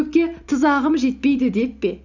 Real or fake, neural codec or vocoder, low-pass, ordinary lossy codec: real; none; 7.2 kHz; none